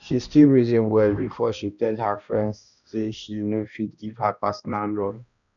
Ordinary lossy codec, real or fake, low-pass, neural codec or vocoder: none; fake; 7.2 kHz; codec, 16 kHz, 1 kbps, X-Codec, HuBERT features, trained on balanced general audio